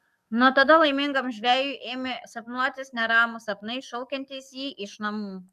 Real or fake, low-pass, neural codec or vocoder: fake; 14.4 kHz; codec, 44.1 kHz, 7.8 kbps, DAC